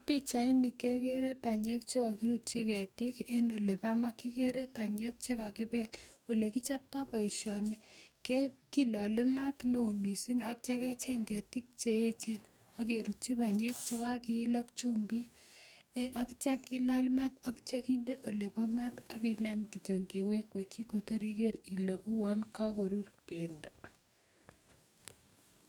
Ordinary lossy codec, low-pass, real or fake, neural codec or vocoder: none; 19.8 kHz; fake; codec, 44.1 kHz, 2.6 kbps, DAC